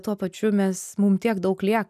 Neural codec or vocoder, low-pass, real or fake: none; 14.4 kHz; real